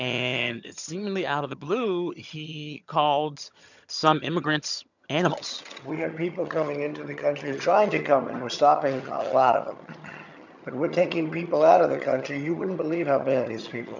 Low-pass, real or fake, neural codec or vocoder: 7.2 kHz; fake; vocoder, 22.05 kHz, 80 mel bands, HiFi-GAN